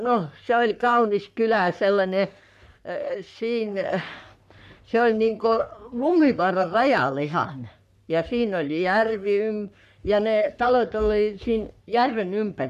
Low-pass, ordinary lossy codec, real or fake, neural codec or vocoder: 14.4 kHz; none; fake; codec, 44.1 kHz, 3.4 kbps, Pupu-Codec